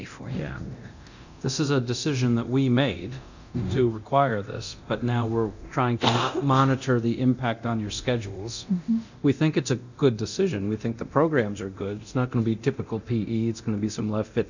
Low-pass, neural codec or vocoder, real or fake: 7.2 kHz; codec, 24 kHz, 0.9 kbps, DualCodec; fake